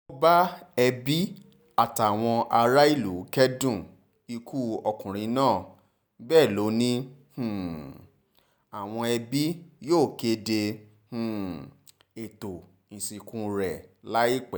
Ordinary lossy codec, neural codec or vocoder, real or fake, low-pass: none; none; real; none